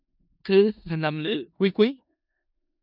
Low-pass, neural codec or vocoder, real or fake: 5.4 kHz; codec, 16 kHz in and 24 kHz out, 0.4 kbps, LongCat-Audio-Codec, four codebook decoder; fake